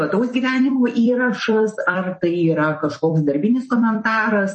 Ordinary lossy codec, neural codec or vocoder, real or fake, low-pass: MP3, 32 kbps; vocoder, 44.1 kHz, 128 mel bands every 256 samples, BigVGAN v2; fake; 10.8 kHz